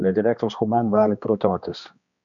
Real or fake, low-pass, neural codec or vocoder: fake; 7.2 kHz; codec, 16 kHz, 2 kbps, X-Codec, HuBERT features, trained on balanced general audio